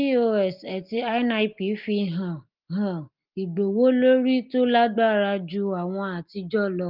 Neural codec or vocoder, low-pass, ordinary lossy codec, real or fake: none; 5.4 kHz; Opus, 32 kbps; real